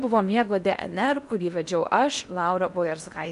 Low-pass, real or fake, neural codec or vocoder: 10.8 kHz; fake; codec, 16 kHz in and 24 kHz out, 0.8 kbps, FocalCodec, streaming, 65536 codes